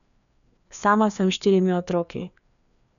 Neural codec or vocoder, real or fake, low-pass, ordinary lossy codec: codec, 16 kHz, 2 kbps, FreqCodec, larger model; fake; 7.2 kHz; none